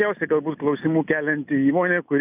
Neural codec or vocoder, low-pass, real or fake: none; 3.6 kHz; real